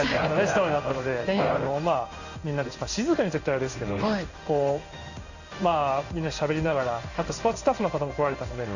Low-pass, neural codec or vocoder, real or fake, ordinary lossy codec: 7.2 kHz; codec, 16 kHz in and 24 kHz out, 1 kbps, XY-Tokenizer; fake; none